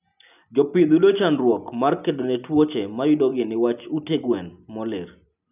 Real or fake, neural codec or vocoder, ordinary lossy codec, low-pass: real; none; none; 3.6 kHz